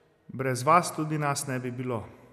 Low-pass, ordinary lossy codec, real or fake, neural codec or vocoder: 14.4 kHz; none; real; none